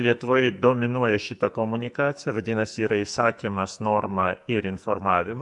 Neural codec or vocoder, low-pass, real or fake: codec, 44.1 kHz, 2.6 kbps, SNAC; 10.8 kHz; fake